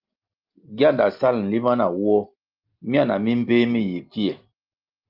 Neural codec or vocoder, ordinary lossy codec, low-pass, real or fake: none; Opus, 32 kbps; 5.4 kHz; real